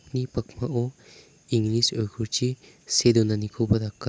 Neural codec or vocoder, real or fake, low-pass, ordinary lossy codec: none; real; none; none